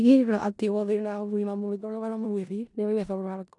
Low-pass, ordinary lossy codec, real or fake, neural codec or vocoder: 10.8 kHz; none; fake; codec, 16 kHz in and 24 kHz out, 0.4 kbps, LongCat-Audio-Codec, four codebook decoder